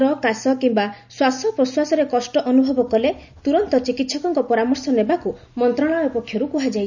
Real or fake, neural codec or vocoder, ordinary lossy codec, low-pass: real; none; none; 7.2 kHz